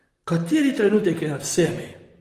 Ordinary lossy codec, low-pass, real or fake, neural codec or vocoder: Opus, 24 kbps; 14.4 kHz; fake; vocoder, 44.1 kHz, 128 mel bands, Pupu-Vocoder